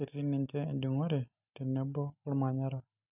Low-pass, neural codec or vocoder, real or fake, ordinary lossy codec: 3.6 kHz; none; real; none